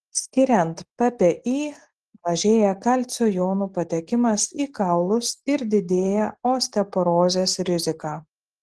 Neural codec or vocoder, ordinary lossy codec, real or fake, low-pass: none; Opus, 16 kbps; real; 10.8 kHz